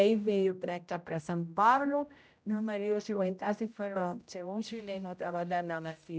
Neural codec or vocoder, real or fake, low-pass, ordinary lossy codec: codec, 16 kHz, 0.5 kbps, X-Codec, HuBERT features, trained on general audio; fake; none; none